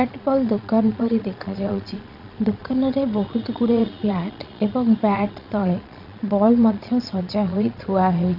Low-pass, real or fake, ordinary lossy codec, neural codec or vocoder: 5.4 kHz; fake; none; vocoder, 22.05 kHz, 80 mel bands, Vocos